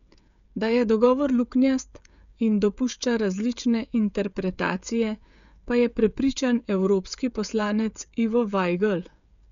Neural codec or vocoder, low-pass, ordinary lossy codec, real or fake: codec, 16 kHz, 16 kbps, FreqCodec, smaller model; 7.2 kHz; MP3, 96 kbps; fake